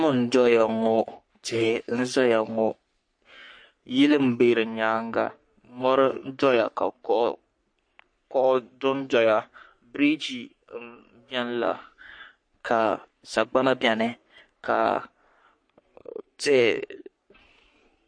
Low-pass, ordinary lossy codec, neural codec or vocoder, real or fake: 9.9 kHz; MP3, 48 kbps; codec, 44.1 kHz, 3.4 kbps, Pupu-Codec; fake